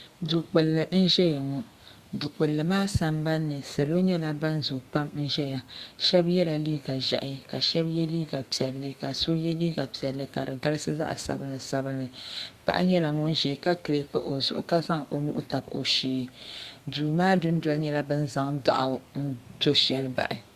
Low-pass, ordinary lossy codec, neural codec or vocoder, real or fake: 14.4 kHz; Opus, 64 kbps; codec, 32 kHz, 1.9 kbps, SNAC; fake